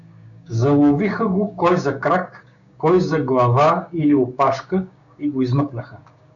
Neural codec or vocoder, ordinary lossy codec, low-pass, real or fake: codec, 16 kHz, 6 kbps, DAC; MP3, 96 kbps; 7.2 kHz; fake